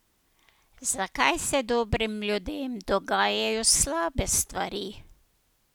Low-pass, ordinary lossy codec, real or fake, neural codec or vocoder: none; none; real; none